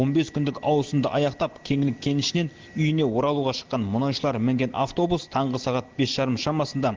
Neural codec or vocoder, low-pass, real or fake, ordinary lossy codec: none; 7.2 kHz; real; Opus, 16 kbps